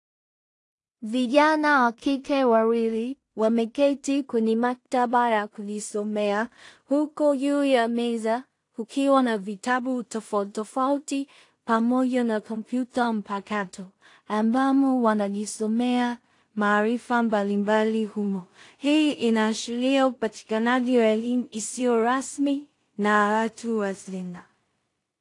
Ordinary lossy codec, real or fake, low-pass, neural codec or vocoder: AAC, 48 kbps; fake; 10.8 kHz; codec, 16 kHz in and 24 kHz out, 0.4 kbps, LongCat-Audio-Codec, two codebook decoder